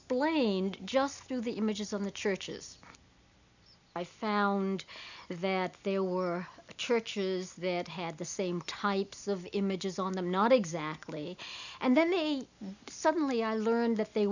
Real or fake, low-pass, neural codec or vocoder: real; 7.2 kHz; none